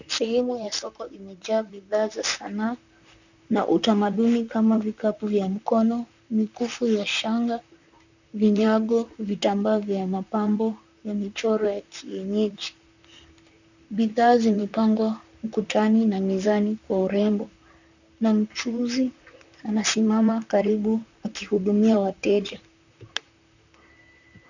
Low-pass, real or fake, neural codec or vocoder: 7.2 kHz; fake; vocoder, 44.1 kHz, 128 mel bands, Pupu-Vocoder